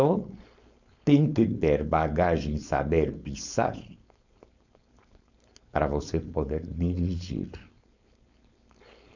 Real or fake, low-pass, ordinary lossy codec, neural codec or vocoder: fake; 7.2 kHz; none; codec, 16 kHz, 4.8 kbps, FACodec